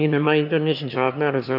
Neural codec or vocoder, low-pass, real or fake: autoencoder, 22.05 kHz, a latent of 192 numbers a frame, VITS, trained on one speaker; 5.4 kHz; fake